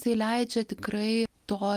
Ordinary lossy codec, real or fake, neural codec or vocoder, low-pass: Opus, 32 kbps; real; none; 14.4 kHz